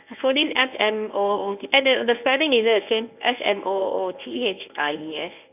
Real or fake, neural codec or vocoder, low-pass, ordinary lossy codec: fake; codec, 24 kHz, 0.9 kbps, WavTokenizer, medium speech release version 1; 3.6 kHz; none